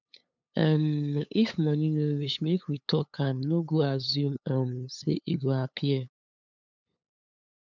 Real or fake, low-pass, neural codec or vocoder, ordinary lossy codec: fake; 7.2 kHz; codec, 16 kHz, 8 kbps, FunCodec, trained on LibriTTS, 25 frames a second; none